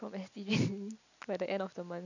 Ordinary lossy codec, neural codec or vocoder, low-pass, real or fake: none; none; 7.2 kHz; real